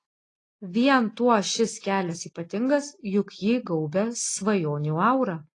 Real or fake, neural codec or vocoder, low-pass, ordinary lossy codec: real; none; 10.8 kHz; AAC, 32 kbps